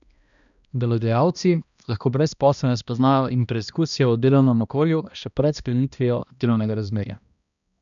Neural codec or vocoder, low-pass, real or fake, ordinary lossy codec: codec, 16 kHz, 1 kbps, X-Codec, HuBERT features, trained on balanced general audio; 7.2 kHz; fake; none